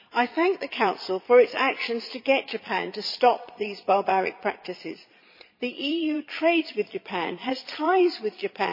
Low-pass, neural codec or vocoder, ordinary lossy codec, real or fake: 5.4 kHz; vocoder, 22.05 kHz, 80 mel bands, Vocos; MP3, 24 kbps; fake